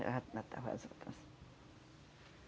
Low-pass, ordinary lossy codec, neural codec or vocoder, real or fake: none; none; none; real